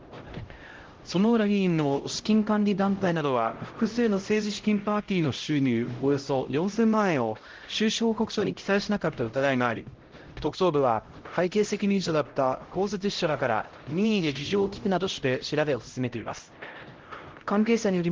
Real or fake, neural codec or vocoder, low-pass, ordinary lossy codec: fake; codec, 16 kHz, 0.5 kbps, X-Codec, HuBERT features, trained on LibriSpeech; 7.2 kHz; Opus, 16 kbps